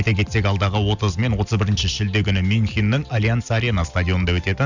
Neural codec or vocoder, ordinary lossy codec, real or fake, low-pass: none; none; real; 7.2 kHz